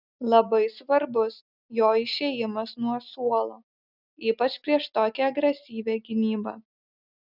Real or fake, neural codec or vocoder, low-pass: real; none; 5.4 kHz